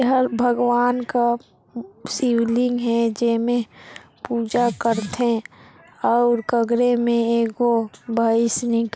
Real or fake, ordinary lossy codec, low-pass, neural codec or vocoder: real; none; none; none